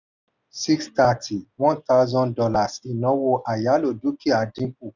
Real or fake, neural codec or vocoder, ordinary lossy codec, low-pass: real; none; none; 7.2 kHz